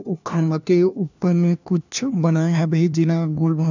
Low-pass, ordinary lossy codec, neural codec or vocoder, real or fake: 7.2 kHz; none; codec, 16 kHz, 1 kbps, FunCodec, trained on LibriTTS, 50 frames a second; fake